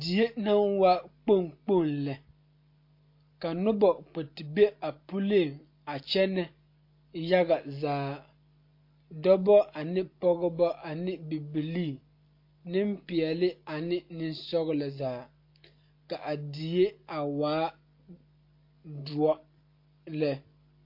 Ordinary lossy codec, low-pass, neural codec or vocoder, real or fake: MP3, 32 kbps; 5.4 kHz; none; real